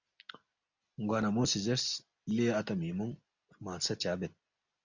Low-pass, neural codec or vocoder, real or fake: 7.2 kHz; none; real